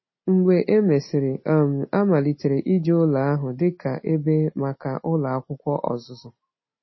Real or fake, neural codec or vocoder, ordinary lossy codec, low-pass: real; none; MP3, 24 kbps; 7.2 kHz